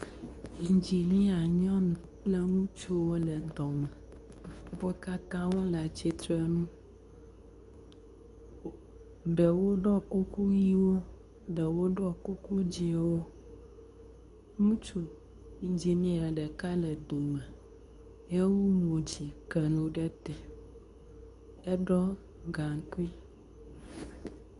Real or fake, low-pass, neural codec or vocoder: fake; 10.8 kHz; codec, 24 kHz, 0.9 kbps, WavTokenizer, medium speech release version 2